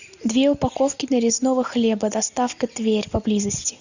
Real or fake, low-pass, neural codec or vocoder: real; 7.2 kHz; none